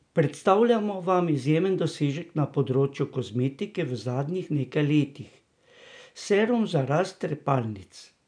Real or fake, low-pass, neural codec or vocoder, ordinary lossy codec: real; 9.9 kHz; none; none